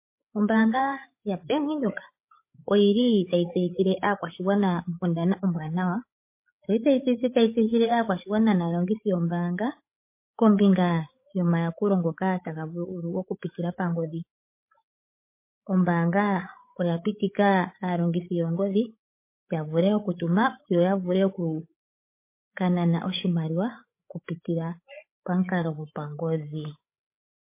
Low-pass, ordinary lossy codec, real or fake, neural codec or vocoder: 3.6 kHz; MP3, 24 kbps; fake; codec, 16 kHz, 8 kbps, FreqCodec, larger model